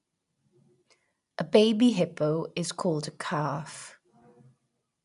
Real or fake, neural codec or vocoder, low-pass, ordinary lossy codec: real; none; 10.8 kHz; none